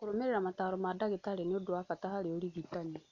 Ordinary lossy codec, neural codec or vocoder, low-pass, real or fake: none; none; 7.2 kHz; real